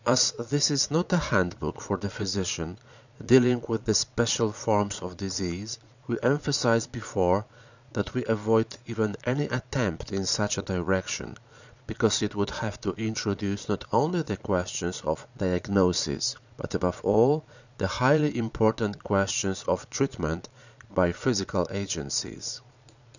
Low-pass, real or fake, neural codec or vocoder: 7.2 kHz; fake; vocoder, 22.05 kHz, 80 mel bands, Vocos